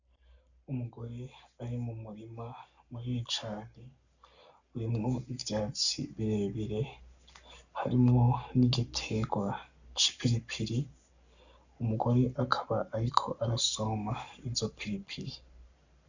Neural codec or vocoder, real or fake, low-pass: codec, 44.1 kHz, 7.8 kbps, Pupu-Codec; fake; 7.2 kHz